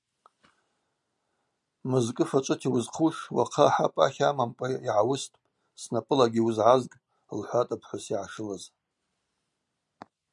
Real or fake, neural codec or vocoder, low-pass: real; none; 10.8 kHz